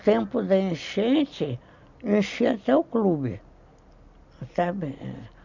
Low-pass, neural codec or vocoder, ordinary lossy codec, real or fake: 7.2 kHz; none; none; real